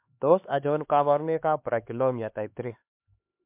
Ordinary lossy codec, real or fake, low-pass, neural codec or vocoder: MP3, 32 kbps; fake; 3.6 kHz; codec, 16 kHz, 2 kbps, X-Codec, HuBERT features, trained on LibriSpeech